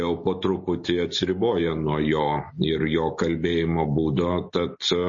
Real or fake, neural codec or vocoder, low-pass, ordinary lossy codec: real; none; 7.2 kHz; MP3, 32 kbps